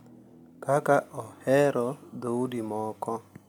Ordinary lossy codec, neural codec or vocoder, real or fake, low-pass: none; none; real; 19.8 kHz